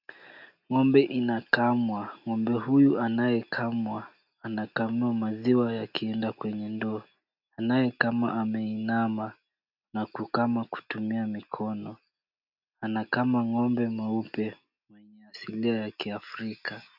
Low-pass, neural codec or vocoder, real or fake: 5.4 kHz; none; real